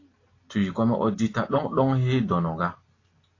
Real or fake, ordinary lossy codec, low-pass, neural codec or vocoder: real; AAC, 32 kbps; 7.2 kHz; none